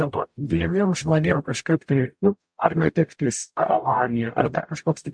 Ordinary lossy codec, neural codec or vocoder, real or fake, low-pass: MP3, 64 kbps; codec, 44.1 kHz, 0.9 kbps, DAC; fake; 9.9 kHz